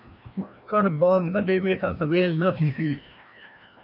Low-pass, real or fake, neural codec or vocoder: 5.4 kHz; fake; codec, 16 kHz, 1 kbps, FreqCodec, larger model